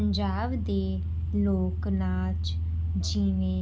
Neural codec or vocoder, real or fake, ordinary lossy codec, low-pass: none; real; none; none